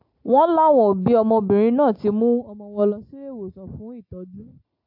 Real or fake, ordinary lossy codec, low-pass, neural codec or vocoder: real; AAC, 48 kbps; 5.4 kHz; none